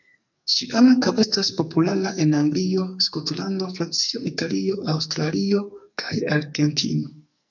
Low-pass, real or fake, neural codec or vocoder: 7.2 kHz; fake; codec, 44.1 kHz, 2.6 kbps, SNAC